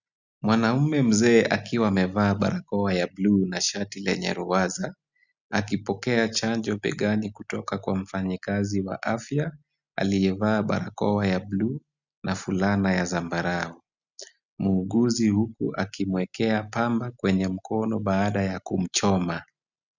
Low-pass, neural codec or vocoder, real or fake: 7.2 kHz; none; real